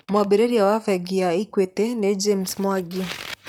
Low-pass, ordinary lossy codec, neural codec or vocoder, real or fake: none; none; vocoder, 44.1 kHz, 128 mel bands, Pupu-Vocoder; fake